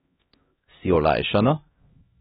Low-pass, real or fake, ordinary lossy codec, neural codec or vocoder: 7.2 kHz; fake; AAC, 16 kbps; codec, 16 kHz, 1 kbps, X-Codec, HuBERT features, trained on LibriSpeech